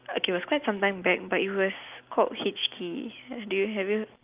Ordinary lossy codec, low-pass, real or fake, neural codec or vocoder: Opus, 24 kbps; 3.6 kHz; real; none